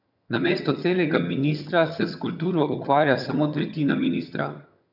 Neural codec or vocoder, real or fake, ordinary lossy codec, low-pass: vocoder, 22.05 kHz, 80 mel bands, HiFi-GAN; fake; none; 5.4 kHz